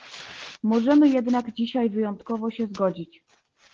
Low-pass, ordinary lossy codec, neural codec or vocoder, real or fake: 7.2 kHz; Opus, 16 kbps; none; real